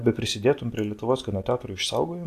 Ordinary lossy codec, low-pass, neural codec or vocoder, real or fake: AAC, 64 kbps; 14.4 kHz; none; real